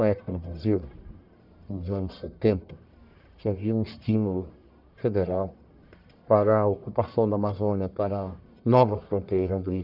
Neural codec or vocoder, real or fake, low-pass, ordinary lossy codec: codec, 44.1 kHz, 1.7 kbps, Pupu-Codec; fake; 5.4 kHz; none